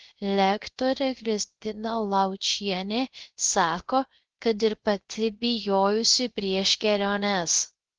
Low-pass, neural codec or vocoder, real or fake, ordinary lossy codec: 7.2 kHz; codec, 16 kHz, 0.3 kbps, FocalCodec; fake; Opus, 16 kbps